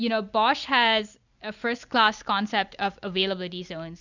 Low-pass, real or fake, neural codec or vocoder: 7.2 kHz; real; none